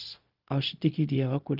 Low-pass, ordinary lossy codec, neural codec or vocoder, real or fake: 5.4 kHz; Opus, 24 kbps; codec, 16 kHz, 0.4 kbps, LongCat-Audio-Codec; fake